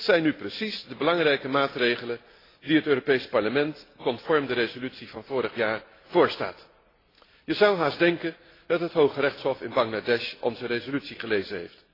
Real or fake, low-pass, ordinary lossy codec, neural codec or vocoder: real; 5.4 kHz; AAC, 24 kbps; none